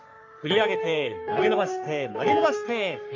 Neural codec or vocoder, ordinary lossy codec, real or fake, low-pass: codec, 44.1 kHz, 3.4 kbps, Pupu-Codec; AAC, 48 kbps; fake; 7.2 kHz